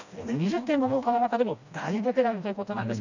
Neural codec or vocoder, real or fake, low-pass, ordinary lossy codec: codec, 16 kHz, 1 kbps, FreqCodec, smaller model; fake; 7.2 kHz; none